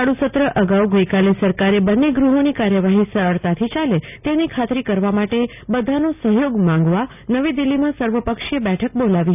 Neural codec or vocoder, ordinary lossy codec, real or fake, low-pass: none; none; real; 3.6 kHz